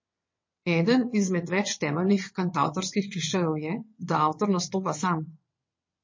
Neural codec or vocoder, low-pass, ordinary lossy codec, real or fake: vocoder, 22.05 kHz, 80 mel bands, WaveNeXt; 7.2 kHz; MP3, 32 kbps; fake